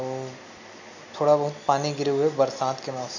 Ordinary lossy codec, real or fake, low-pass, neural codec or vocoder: none; real; 7.2 kHz; none